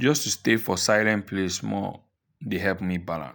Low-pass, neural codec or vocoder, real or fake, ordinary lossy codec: none; none; real; none